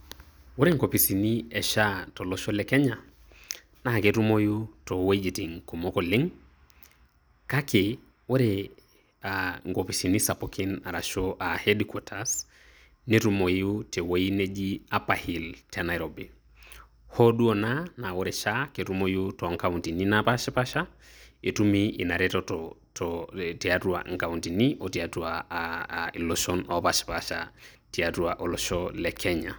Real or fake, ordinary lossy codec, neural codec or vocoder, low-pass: real; none; none; none